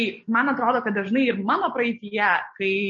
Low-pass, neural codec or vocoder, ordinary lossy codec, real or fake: 7.2 kHz; none; MP3, 32 kbps; real